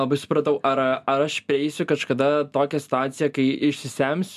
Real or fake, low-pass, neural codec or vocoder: real; 14.4 kHz; none